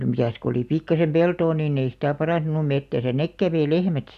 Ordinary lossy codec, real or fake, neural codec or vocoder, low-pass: none; real; none; 14.4 kHz